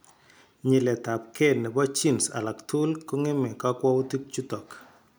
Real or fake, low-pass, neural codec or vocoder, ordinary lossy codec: real; none; none; none